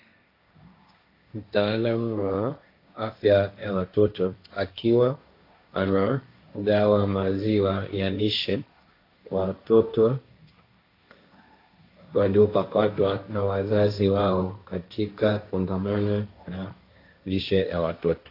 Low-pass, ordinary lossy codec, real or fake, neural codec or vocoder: 5.4 kHz; AAC, 32 kbps; fake; codec, 16 kHz, 1.1 kbps, Voila-Tokenizer